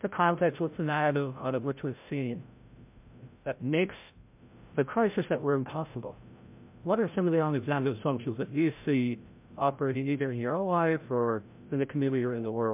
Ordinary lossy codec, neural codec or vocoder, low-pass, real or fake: MP3, 32 kbps; codec, 16 kHz, 0.5 kbps, FreqCodec, larger model; 3.6 kHz; fake